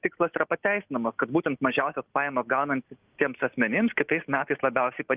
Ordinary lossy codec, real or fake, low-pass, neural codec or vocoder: Opus, 24 kbps; real; 3.6 kHz; none